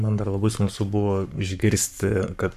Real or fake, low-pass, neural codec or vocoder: fake; 14.4 kHz; codec, 44.1 kHz, 7.8 kbps, Pupu-Codec